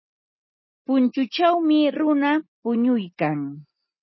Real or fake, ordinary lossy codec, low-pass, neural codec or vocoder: real; MP3, 24 kbps; 7.2 kHz; none